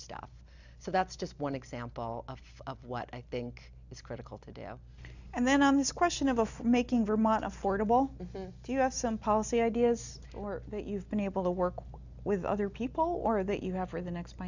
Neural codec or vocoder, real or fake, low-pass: none; real; 7.2 kHz